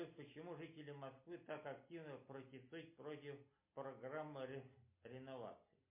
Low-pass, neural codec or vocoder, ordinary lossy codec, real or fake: 3.6 kHz; none; MP3, 24 kbps; real